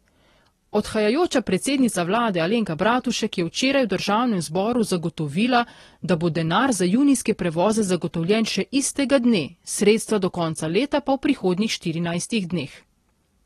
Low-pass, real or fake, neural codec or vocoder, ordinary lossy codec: 19.8 kHz; real; none; AAC, 32 kbps